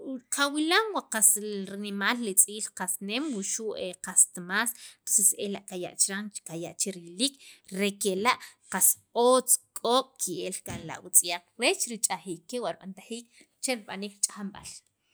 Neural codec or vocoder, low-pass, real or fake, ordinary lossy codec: none; none; real; none